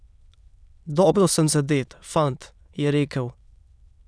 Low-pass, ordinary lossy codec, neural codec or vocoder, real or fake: none; none; autoencoder, 22.05 kHz, a latent of 192 numbers a frame, VITS, trained on many speakers; fake